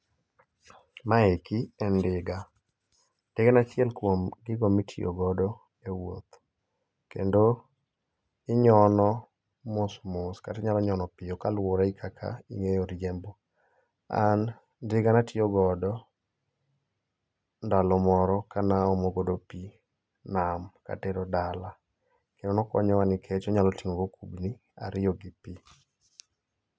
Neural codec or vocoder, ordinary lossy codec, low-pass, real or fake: none; none; none; real